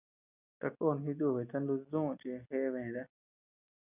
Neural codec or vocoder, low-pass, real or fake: none; 3.6 kHz; real